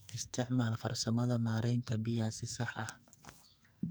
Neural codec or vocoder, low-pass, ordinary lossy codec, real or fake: codec, 44.1 kHz, 2.6 kbps, SNAC; none; none; fake